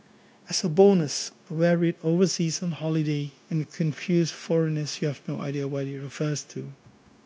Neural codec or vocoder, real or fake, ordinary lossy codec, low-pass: codec, 16 kHz, 0.9 kbps, LongCat-Audio-Codec; fake; none; none